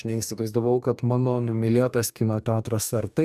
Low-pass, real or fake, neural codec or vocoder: 14.4 kHz; fake; codec, 44.1 kHz, 2.6 kbps, DAC